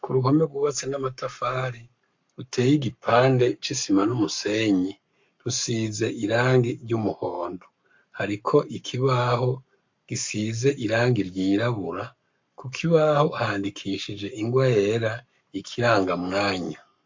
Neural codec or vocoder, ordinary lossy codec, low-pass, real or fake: codec, 44.1 kHz, 7.8 kbps, Pupu-Codec; MP3, 48 kbps; 7.2 kHz; fake